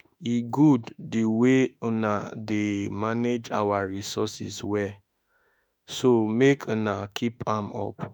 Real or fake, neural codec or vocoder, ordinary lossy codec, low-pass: fake; autoencoder, 48 kHz, 32 numbers a frame, DAC-VAE, trained on Japanese speech; none; none